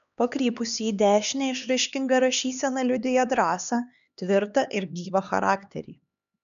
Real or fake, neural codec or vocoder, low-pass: fake; codec, 16 kHz, 2 kbps, X-Codec, HuBERT features, trained on LibriSpeech; 7.2 kHz